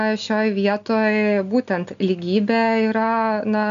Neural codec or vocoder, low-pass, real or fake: none; 7.2 kHz; real